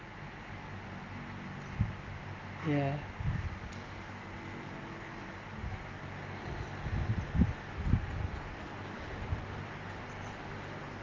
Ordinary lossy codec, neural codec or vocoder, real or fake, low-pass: Opus, 24 kbps; none; real; 7.2 kHz